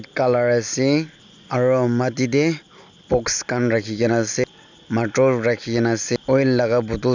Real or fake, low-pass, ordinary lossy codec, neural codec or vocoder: real; 7.2 kHz; none; none